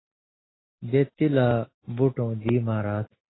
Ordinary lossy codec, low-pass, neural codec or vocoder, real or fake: AAC, 16 kbps; 7.2 kHz; none; real